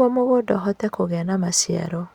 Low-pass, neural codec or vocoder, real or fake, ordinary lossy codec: 19.8 kHz; none; real; Opus, 64 kbps